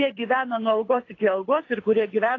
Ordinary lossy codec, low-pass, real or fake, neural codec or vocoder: AAC, 32 kbps; 7.2 kHz; fake; codec, 24 kHz, 6 kbps, HILCodec